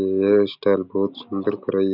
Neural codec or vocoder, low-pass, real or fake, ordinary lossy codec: none; 5.4 kHz; real; none